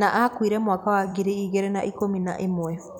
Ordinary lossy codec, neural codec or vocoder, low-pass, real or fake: none; vocoder, 44.1 kHz, 128 mel bands every 256 samples, BigVGAN v2; none; fake